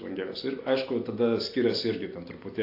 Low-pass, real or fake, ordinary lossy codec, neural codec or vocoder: 5.4 kHz; real; AAC, 32 kbps; none